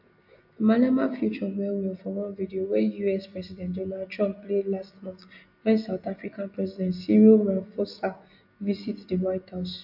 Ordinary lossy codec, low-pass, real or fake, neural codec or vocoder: AAC, 48 kbps; 5.4 kHz; real; none